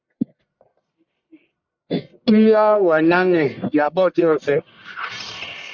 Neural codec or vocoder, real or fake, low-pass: codec, 44.1 kHz, 1.7 kbps, Pupu-Codec; fake; 7.2 kHz